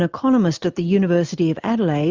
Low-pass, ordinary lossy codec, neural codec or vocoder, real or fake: 7.2 kHz; Opus, 32 kbps; none; real